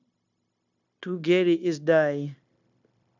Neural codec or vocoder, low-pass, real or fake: codec, 16 kHz, 0.9 kbps, LongCat-Audio-Codec; 7.2 kHz; fake